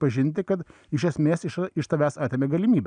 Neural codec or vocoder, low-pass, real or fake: none; 9.9 kHz; real